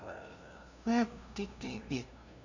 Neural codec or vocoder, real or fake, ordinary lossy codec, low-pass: codec, 16 kHz, 0.5 kbps, FunCodec, trained on LibriTTS, 25 frames a second; fake; none; 7.2 kHz